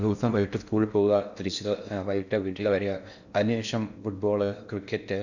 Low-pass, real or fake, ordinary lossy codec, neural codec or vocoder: 7.2 kHz; fake; none; codec, 16 kHz in and 24 kHz out, 0.6 kbps, FocalCodec, streaming, 4096 codes